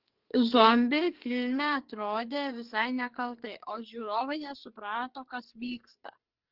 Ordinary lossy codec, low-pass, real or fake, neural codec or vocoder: Opus, 16 kbps; 5.4 kHz; fake; codec, 44.1 kHz, 2.6 kbps, SNAC